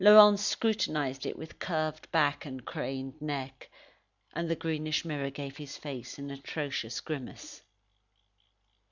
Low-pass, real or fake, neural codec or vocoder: 7.2 kHz; real; none